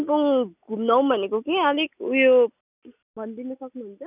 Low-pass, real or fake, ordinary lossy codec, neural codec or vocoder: 3.6 kHz; real; none; none